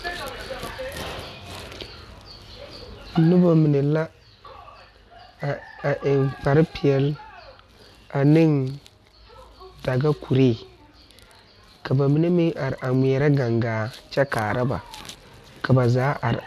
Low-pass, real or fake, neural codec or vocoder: 14.4 kHz; real; none